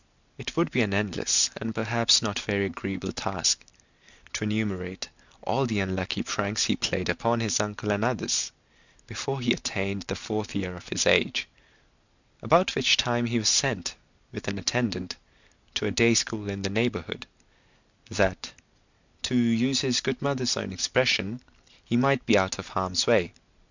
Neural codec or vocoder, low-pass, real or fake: none; 7.2 kHz; real